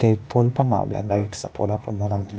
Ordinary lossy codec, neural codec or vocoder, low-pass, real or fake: none; codec, 16 kHz, 0.8 kbps, ZipCodec; none; fake